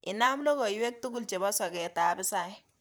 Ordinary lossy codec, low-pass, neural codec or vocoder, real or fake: none; none; vocoder, 44.1 kHz, 128 mel bands, Pupu-Vocoder; fake